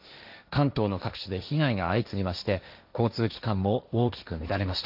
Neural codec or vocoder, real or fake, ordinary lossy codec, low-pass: codec, 16 kHz, 1.1 kbps, Voila-Tokenizer; fake; none; 5.4 kHz